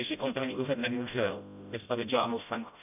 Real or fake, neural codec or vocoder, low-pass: fake; codec, 16 kHz, 0.5 kbps, FreqCodec, smaller model; 3.6 kHz